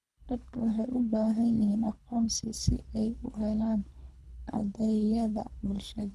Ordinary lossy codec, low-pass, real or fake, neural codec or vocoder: none; none; fake; codec, 24 kHz, 3 kbps, HILCodec